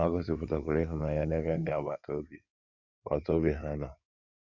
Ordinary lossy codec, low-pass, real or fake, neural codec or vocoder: none; 7.2 kHz; fake; codec, 16 kHz in and 24 kHz out, 2.2 kbps, FireRedTTS-2 codec